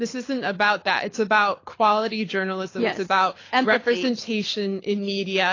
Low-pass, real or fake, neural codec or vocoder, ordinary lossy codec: 7.2 kHz; fake; codec, 24 kHz, 6 kbps, HILCodec; AAC, 32 kbps